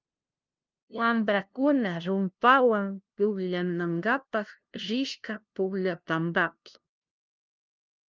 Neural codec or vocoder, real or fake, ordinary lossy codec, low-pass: codec, 16 kHz, 0.5 kbps, FunCodec, trained on LibriTTS, 25 frames a second; fake; Opus, 24 kbps; 7.2 kHz